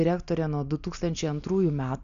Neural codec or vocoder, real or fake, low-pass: none; real; 7.2 kHz